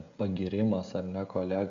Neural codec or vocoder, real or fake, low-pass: codec, 16 kHz, 16 kbps, FreqCodec, smaller model; fake; 7.2 kHz